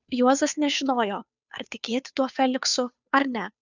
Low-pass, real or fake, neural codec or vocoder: 7.2 kHz; fake; codec, 16 kHz, 2 kbps, FunCodec, trained on Chinese and English, 25 frames a second